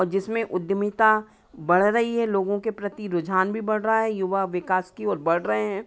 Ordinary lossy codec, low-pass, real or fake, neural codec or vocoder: none; none; real; none